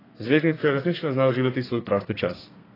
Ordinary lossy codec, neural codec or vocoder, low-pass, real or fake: AAC, 24 kbps; codec, 32 kHz, 1.9 kbps, SNAC; 5.4 kHz; fake